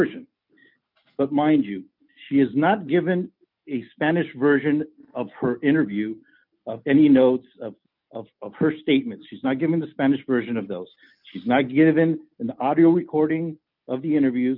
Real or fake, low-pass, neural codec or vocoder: real; 5.4 kHz; none